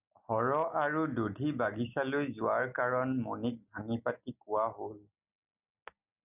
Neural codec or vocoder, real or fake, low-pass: none; real; 3.6 kHz